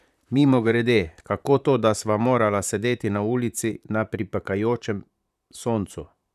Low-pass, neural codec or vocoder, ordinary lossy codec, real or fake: 14.4 kHz; vocoder, 44.1 kHz, 128 mel bands, Pupu-Vocoder; none; fake